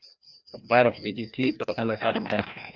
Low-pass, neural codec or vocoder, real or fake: 7.2 kHz; codec, 16 kHz, 1 kbps, FreqCodec, larger model; fake